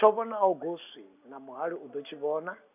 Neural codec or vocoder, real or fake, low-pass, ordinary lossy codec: none; real; 3.6 kHz; none